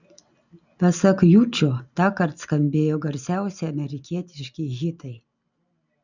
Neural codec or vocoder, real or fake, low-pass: none; real; 7.2 kHz